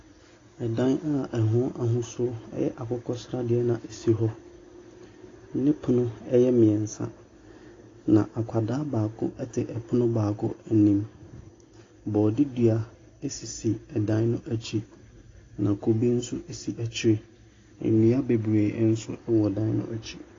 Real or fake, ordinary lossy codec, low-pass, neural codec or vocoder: real; AAC, 32 kbps; 7.2 kHz; none